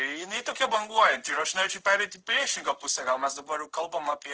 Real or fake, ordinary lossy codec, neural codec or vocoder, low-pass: fake; Opus, 16 kbps; codec, 16 kHz in and 24 kHz out, 1 kbps, XY-Tokenizer; 7.2 kHz